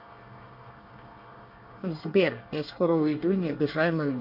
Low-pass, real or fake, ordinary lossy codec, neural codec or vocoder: 5.4 kHz; fake; MP3, 32 kbps; codec, 24 kHz, 1 kbps, SNAC